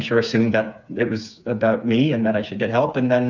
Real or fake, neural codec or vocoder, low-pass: fake; codec, 16 kHz, 4 kbps, FreqCodec, smaller model; 7.2 kHz